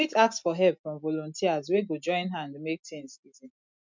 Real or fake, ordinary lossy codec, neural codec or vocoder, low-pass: real; MP3, 64 kbps; none; 7.2 kHz